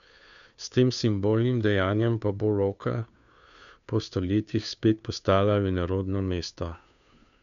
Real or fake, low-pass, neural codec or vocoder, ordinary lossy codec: fake; 7.2 kHz; codec, 16 kHz, 2 kbps, FunCodec, trained on Chinese and English, 25 frames a second; none